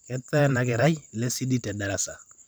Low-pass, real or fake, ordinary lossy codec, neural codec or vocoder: none; fake; none; vocoder, 44.1 kHz, 128 mel bands every 512 samples, BigVGAN v2